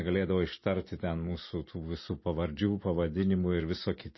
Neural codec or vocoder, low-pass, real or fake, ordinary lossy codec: none; 7.2 kHz; real; MP3, 24 kbps